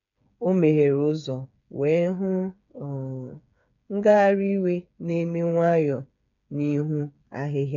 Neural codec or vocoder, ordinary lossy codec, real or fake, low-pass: codec, 16 kHz, 8 kbps, FreqCodec, smaller model; none; fake; 7.2 kHz